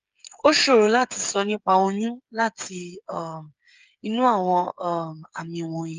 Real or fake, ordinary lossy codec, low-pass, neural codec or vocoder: fake; Opus, 32 kbps; 7.2 kHz; codec, 16 kHz, 8 kbps, FreqCodec, smaller model